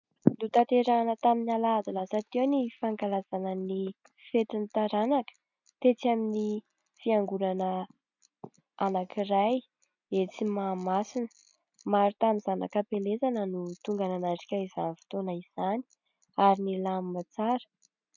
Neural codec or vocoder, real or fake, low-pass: none; real; 7.2 kHz